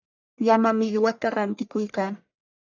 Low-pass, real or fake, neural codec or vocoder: 7.2 kHz; fake; codec, 44.1 kHz, 1.7 kbps, Pupu-Codec